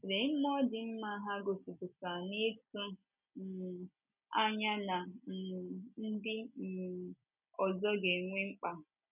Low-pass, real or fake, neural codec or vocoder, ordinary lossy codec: 3.6 kHz; real; none; none